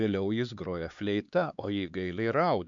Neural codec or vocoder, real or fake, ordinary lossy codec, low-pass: codec, 16 kHz, 4 kbps, X-Codec, HuBERT features, trained on LibriSpeech; fake; MP3, 64 kbps; 7.2 kHz